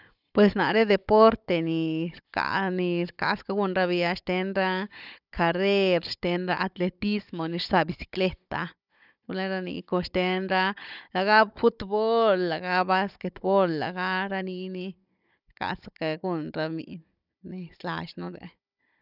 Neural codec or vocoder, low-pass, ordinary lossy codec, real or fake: codec, 16 kHz, 16 kbps, FunCodec, trained on Chinese and English, 50 frames a second; 5.4 kHz; none; fake